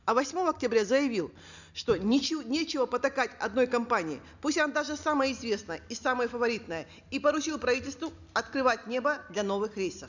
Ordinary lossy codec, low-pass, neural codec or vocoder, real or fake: none; 7.2 kHz; none; real